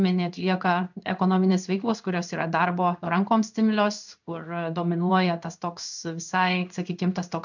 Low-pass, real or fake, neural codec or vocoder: 7.2 kHz; fake; codec, 16 kHz in and 24 kHz out, 1 kbps, XY-Tokenizer